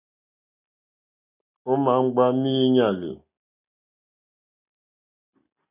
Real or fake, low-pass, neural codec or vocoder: real; 3.6 kHz; none